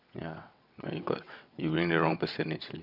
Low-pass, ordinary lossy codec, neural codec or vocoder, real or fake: 5.4 kHz; none; vocoder, 22.05 kHz, 80 mel bands, WaveNeXt; fake